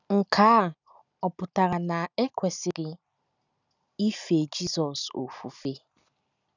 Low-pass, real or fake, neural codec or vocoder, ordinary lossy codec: 7.2 kHz; real; none; none